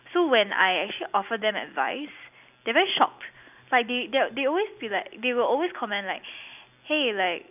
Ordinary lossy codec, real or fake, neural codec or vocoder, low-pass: none; real; none; 3.6 kHz